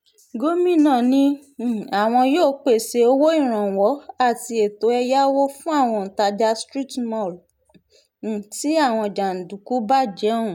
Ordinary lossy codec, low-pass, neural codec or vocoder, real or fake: none; 19.8 kHz; none; real